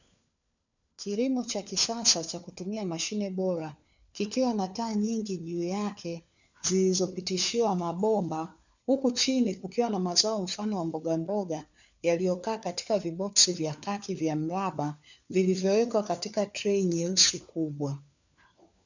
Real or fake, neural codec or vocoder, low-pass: fake; codec, 16 kHz, 4 kbps, FunCodec, trained on LibriTTS, 50 frames a second; 7.2 kHz